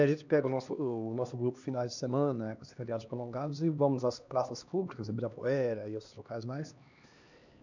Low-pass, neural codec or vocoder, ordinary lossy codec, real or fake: 7.2 kHz; codec, 16 kHz, 2 kbps, X-Codec, HuBERT features, trained on LibriSpeech; none; fake